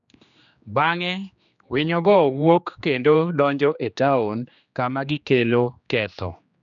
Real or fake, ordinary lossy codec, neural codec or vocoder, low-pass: fake; none; codec, 16 kHz, 2 kbps, X-Codec, HuBERT features, trained on general audio; 7.2 kHz